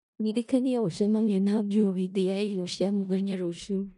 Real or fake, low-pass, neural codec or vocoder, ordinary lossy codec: fake; 10.8 kHz; codec, 16 kHz in and 24 kHz out, 0.4 kbps, LongCat-Audio-Codec, four codebook decoder; none